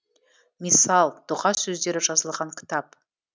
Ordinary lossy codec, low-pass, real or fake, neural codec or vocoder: none; none; real; none